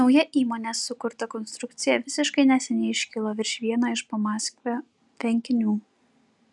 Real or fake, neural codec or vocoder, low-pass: real; none; 10.8 kHz